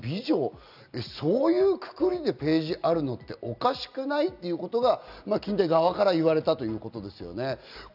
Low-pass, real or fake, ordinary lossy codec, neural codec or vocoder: 5.4 kHz; real; none; none